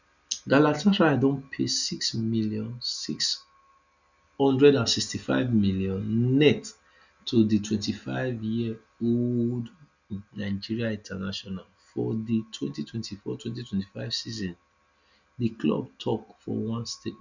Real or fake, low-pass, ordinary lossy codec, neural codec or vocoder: real; 7.2 kHz; none; none